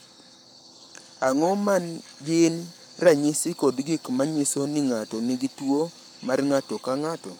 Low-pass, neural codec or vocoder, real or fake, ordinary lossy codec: none; codec, 44.1 kHz, 7.8 kbps, Pupu-Codec; fake; none